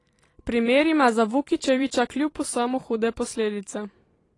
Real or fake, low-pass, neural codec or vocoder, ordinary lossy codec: fake; 10.8 kHz; vocoder, 44.1 kHz, 128 mel bands every 512 samples, BigVGAN v2; AAC, 32 kbps